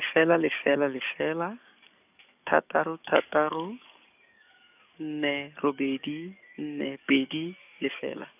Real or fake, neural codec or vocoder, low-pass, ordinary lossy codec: fake; codec, 44.1 kHz, 7.8 kbps, DAC; 3.6 kHz; none